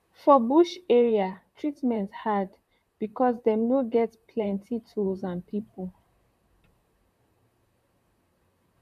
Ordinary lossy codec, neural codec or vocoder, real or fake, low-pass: none; vocoder, 44.1 kHz, 128 mel bands, Pupu-Vocoder; fake; 14.4 kHz